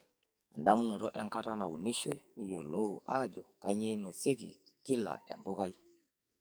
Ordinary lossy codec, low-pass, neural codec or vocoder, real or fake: none; none; codec, 44.1 kHz, 2.6 kbps, SNAC; fake